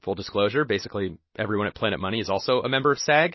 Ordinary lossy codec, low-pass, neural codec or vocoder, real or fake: MP3, 24 kbps; 7.2 kHz; none; real